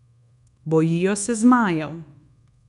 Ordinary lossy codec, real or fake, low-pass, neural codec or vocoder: none; fake; 10.8 kHz; codec, 24 kHz, 1.2 kbps, DualCodec